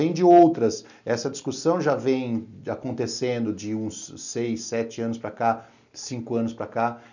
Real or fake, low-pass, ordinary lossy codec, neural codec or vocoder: real; 7.2 kHz; none; none